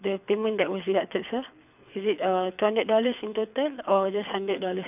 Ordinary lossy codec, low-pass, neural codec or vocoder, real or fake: none; 3.6 kHz; codec, 24 kHz, 6 kbps, HILCodec; fake